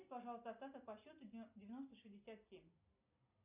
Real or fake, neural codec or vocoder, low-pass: real; none; 3.6 kHz